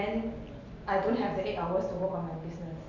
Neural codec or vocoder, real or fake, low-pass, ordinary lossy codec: none; real; 7.2 kHz; none